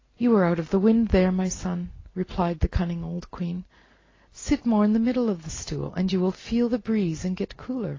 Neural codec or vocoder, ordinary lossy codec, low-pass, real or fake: none; AAC, 32 kbps; 7.2 kHz; real